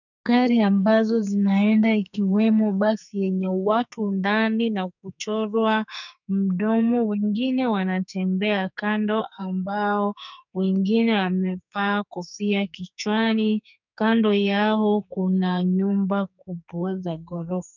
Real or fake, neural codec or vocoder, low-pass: fake; codec, 44.1 kHz, 2.6 kbps, SNAC; 7.2 kHz